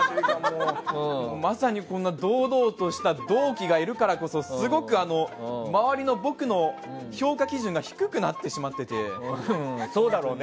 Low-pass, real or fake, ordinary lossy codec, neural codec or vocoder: none; real; none; none